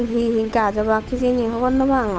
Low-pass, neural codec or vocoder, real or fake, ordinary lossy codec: none; codec, 16 kHz, 8 kbps, FunCodec, trained on Chinese and English, 25 frames a second; fake; none